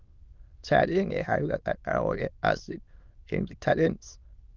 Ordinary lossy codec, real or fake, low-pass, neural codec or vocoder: Opus, 24 kbps; fake; 7.2 kHz; autoencoder, 22.05 kHz, a latent of 192 numbers a frame, VITS, trained on many speakers